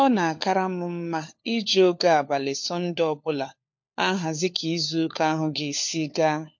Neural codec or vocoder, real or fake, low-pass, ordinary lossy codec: codec, 16 kHz, 4 kbps, FunCodec, trained on LibriTTS, 50 frames a second; fake; 7.2 kHz; MP3, 48 kbps